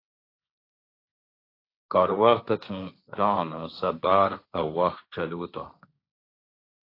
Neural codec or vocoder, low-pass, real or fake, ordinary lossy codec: codec, 16 kHz, 1.1 kbps, Voila-Tokenizer; 5.4 kHz; fake; AAC, 32 kbps